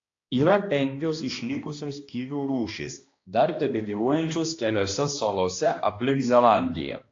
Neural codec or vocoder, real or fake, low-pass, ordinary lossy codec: codec, 16 kHz, 1 kbps, X-Codec, HuBERT features, trained on balanced general audio; fake; 7.2 kHz; AAC, 48 kbps